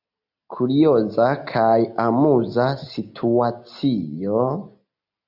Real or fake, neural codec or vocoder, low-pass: real; none; 5.4 kHz